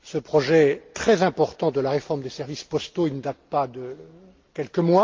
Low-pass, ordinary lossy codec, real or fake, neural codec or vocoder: 7.2 kHz; Opus, 32 kbps; real; none